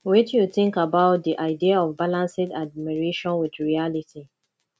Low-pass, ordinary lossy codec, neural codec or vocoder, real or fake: none; none; none; real